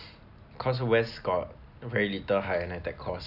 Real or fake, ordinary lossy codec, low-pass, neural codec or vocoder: real; none; 5.4 kHz; none